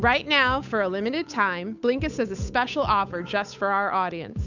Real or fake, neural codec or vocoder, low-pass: fake; codec, 16 kHz, 8 kbps, FunCodec, trained on Chinese and English, 25 frames a second; 7.2 kHz